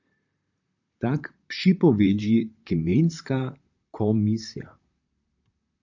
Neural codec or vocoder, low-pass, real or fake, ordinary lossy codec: vocoder, 44.1 kHz, 128 mel bands, Pupu-Vocoder; 7.2 kHz; fake; AAC, 48 kbps